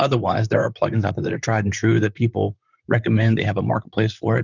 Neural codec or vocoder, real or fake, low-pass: vocoder, 44.1 kHz, 128 mel bands, Pupu-Vocoder; fake; 7.2 kHz